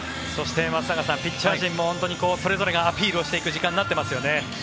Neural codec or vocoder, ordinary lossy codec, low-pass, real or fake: none; none; none; real